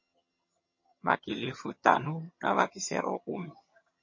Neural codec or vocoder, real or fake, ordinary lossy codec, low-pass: vocoder, 22.05 kHz, 80 mel bands, HiFi-GAN; fake; MP3, 32 kbps; 7.2 kHz